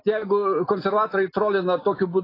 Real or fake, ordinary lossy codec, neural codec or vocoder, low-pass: real; AAC, 24 kbps; none; 5.4 kHz